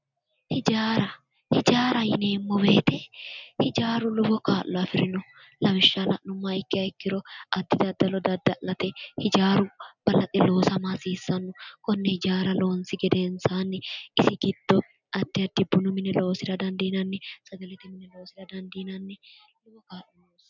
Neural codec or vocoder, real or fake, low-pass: none; real; 7.2 kHz